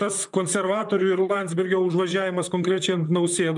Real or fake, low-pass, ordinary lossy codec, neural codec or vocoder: fake; 10.8 kHz; MP3, 96 kbps; vocoder, 44.1 kHz, 128 mel bands, Pupu-Vocoder